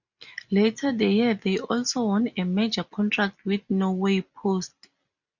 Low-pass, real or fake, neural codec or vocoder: 7.2 kHz; real; none